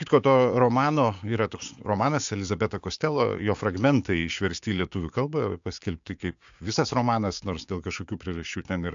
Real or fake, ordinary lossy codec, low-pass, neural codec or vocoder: fake; AAC, 64 kbps; 7.2 kHz; codec, 16 kHz, 6 kbps, DAC